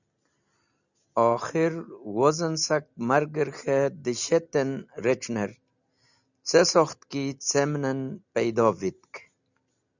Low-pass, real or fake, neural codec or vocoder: 7.2 kHz; real; none